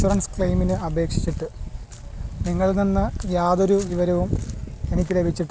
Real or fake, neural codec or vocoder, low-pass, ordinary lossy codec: real; none; none; none